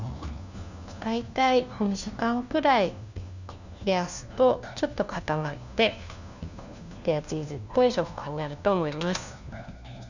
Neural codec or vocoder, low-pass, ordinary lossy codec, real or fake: codec, 16 kHz, 1 kbps, FunCodec, trained on LibriTTS, 50 frames a second; 7.2 kHz; none; fake